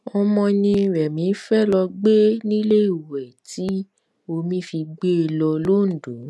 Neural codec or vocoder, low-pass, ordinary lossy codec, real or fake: none; none; none; real